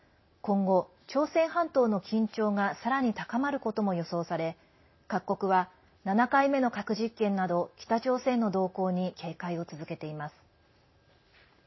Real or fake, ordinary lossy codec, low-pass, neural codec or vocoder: real; MP3, 24 kbps; 7.2 kHz; none